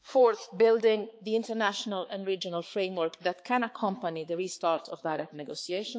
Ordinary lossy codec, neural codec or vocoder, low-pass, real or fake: none; codec, 16 kHz, 4 kbps, X-Codec, HuBERT features, trained on balanced general audio; none; fake